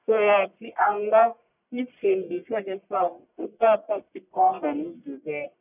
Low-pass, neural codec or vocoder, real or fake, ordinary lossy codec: 3.6 kHz; codec, 44.1 kHz, 1.7 kbps, Pupu-Codec; fake; none